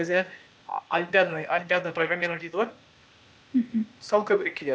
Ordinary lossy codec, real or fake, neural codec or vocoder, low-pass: none; fake; codec, 16 kHz, 0.8 kbps, ZipCodec; none